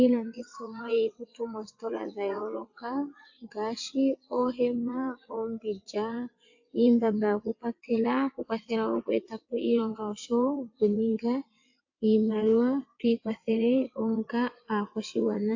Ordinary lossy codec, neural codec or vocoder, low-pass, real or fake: AAC, 48 kbps; vocoder, 22.05 kHz, 80 mel bands, WaveNeXt; 7.2 kHz; fake